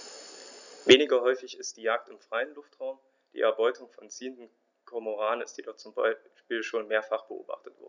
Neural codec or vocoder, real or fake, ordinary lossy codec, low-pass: none; real; none; 7.2 kHz